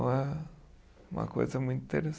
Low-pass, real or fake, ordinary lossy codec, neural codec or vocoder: none; real; none; none